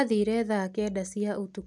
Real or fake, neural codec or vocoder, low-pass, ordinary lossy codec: real; none; none; none